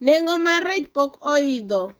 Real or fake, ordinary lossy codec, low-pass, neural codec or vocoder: fake; none; none; codec, 44.1 kHz, 2.6 kbps, SNAC